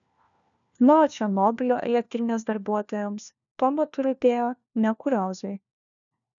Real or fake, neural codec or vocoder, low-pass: fake; codec, 16 kHz, 1 kbps, FunCodec, trained on LibriTTS, 50 frames a second; 7.2 kHz